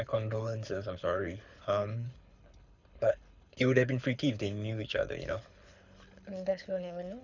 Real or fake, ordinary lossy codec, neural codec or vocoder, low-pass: fake; none; codec, 24 kHz, 6 kbps, HILCodec; 7.2 kHz